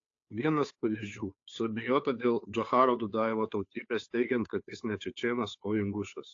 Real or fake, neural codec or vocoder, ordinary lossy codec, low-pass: fake; codec, 16 kHz, 2 kbps, FunCodec, trained on Chinese and English, 25 frames a second; AAC, 48 kbps; 7.2 kHz